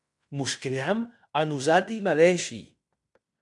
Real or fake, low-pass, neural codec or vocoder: fake; 10.8 kHz; codec, 16 kHz in and 24 kHz out, 0.9 kbps, LongCat-Audio-Codec, fine tuned four codebook decoder